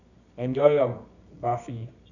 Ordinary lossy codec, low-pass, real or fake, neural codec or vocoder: none; 7.2 kHz; fake; codec, 24 kHz, 0.9 kbps, WavTokenizer, medium music audio release